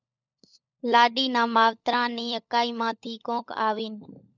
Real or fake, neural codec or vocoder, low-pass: fake; codec, 16 kHz, 16 kbps, FunCodec, trained on LibriTTS, 50 frames a second; 7.2 kHz